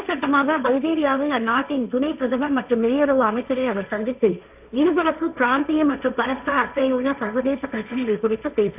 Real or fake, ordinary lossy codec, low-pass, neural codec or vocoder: fake; none; 3.6 kHz; codec, 16 kHz, 1.1 kbps, Voila-Tokenizer